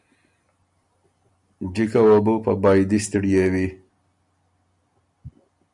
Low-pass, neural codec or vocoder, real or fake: 10.8 kHz; none; real